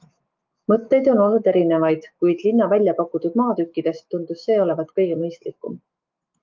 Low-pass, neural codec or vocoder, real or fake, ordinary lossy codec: 7.2 kHz; autoencoder, 48 kHz, 128 numbers a frame, DAC-VAE, trained on Japanese speech; fake; Opus, 24 kbps